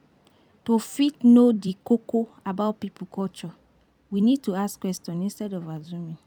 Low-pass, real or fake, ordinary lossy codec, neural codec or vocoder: 19.8 kHz; fake; none; vocoder, 44.1 kHz, 128 mel bands every 256 samples, BigVGAN v2